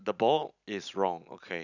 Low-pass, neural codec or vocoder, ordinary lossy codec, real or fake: 7.2 kHz; none; none; real